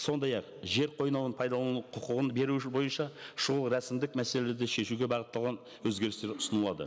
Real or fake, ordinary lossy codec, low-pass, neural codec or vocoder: real; none; none; none